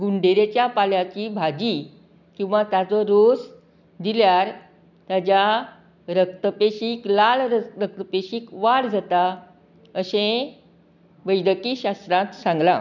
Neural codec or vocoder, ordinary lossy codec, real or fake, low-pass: none; none; real; 7.2 kHz